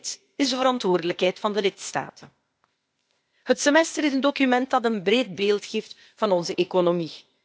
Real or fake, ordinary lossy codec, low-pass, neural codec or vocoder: fake; none; none; codec, 16 kHz, 0.8 kbps, ZipCodec